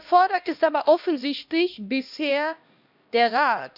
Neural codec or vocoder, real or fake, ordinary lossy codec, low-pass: codec, 16 kHz, 1 kbps, X-Codec, HuBERT features, trained on LibriSpeech; fake; none; 5.4 kHz